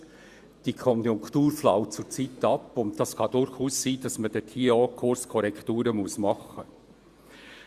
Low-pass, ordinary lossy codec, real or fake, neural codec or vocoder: 14.4 kHz; Opus, 64 kbps; fake; vocoder, 48 kHz, 128 mel bands, Vocos